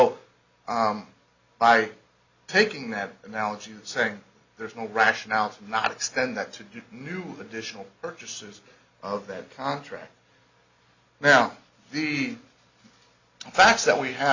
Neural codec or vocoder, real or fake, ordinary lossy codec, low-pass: none; real; Opus, 64 kbps; 7.2 kHz